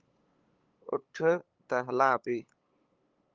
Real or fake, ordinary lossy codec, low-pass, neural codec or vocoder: fake; Opus, 32 kbps; 7.2 kHz; codec, 16 kHz, 8 kbps, FunCodec, trained on LibriTTS, 25 frames a second